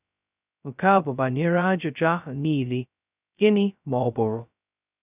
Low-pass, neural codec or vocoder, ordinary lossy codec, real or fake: 3.6 kHz; codec, 16 kHz, 0.2 kbps, FocalCodec; none; fake